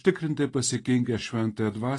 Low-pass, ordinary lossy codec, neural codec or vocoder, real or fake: 10.8 kHz; AAC, 32 kbps; none; real